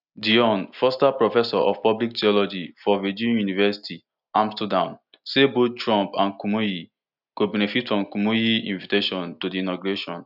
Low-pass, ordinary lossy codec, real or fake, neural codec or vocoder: 5.4 kHz; none; real; none